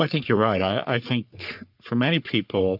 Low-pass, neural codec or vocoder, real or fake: 5.4 kHz; codec, 44.1 kHz, 3.4 kbps, Pupu-Codec; fake